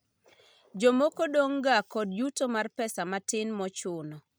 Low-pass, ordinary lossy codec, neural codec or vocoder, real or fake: none; none; none; real